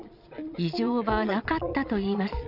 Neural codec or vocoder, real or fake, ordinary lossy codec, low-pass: vocoder, 22.05 kHz, 80 mel bands, Vocos; fake; none; 5.4 kHz